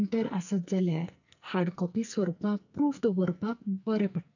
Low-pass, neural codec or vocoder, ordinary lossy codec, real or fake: 7.2 kHz; codec, 44.1 kHz, 2.6 kbps, SNAC; none; fake